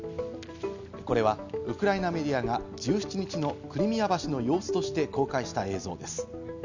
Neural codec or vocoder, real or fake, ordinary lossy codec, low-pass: none; real; none; 7.2 kHz